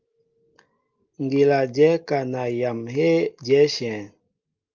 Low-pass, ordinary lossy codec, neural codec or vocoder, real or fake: 7.2 kHz; Opus, 24 kbps; none; real